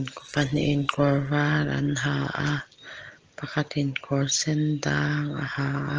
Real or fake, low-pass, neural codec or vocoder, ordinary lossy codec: real; 7.2 kHz; none; Opus, 16 kbps